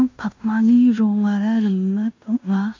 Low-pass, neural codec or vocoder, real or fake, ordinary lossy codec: 7.2 kHz; codec, 16 kHz in and 24 kHz out, 0.9 kbps, LongCat-Audio-Codec, fine tuned four codebook decoder; fake; MP3, 64 kbps